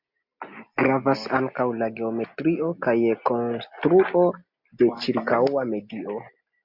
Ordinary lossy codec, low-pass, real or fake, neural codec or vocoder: MP3, 48 kbps; 5.4 kHz; real; none